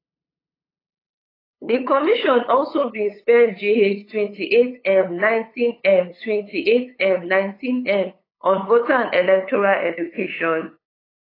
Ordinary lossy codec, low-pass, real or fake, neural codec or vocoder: AAC, 24 kbps; 5.4 kHz; fake; codec, 16 kHz, 8 kbps, FunCodec, trained on LibriTTS, 25 frames a second